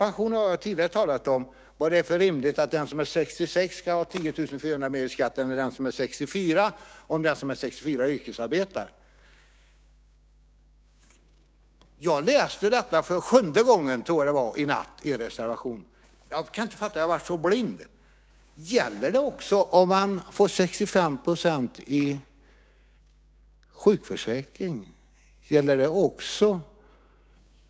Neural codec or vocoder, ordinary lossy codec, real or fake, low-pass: codec, 16 kHz, 6 kbps, DAC; none; fake; none